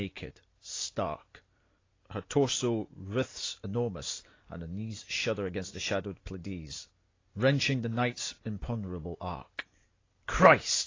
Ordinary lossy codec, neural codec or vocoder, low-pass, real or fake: AAC, 32 kbps; none; 7.2 kHz; real